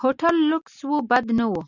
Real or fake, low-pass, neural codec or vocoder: real; 7.2 kHz; none